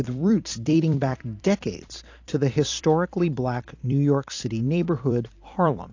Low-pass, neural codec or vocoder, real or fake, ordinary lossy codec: 7.2 kHz; vocoder, 44.1 kHz, 128 mel bands every 256 samples, BigVGAN v2; fake; AAC, 48 kbps